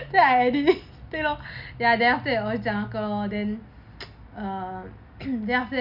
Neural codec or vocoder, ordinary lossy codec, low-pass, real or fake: none; none; 5.4 kHz; real